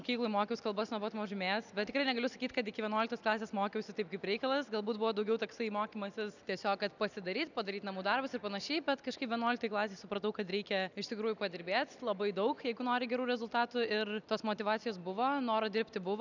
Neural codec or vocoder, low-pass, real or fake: none; 7.2 kHz; real